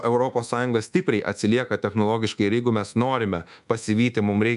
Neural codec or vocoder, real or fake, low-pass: codec, 24 kHz, 1.2 kbps, DualCodec; fake; 10.8 kHz